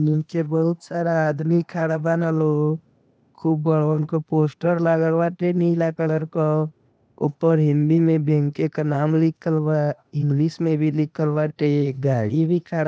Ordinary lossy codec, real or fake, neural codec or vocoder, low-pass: none; fake; codec, 16 kHz, 0.8 kbps, ZipCodec; none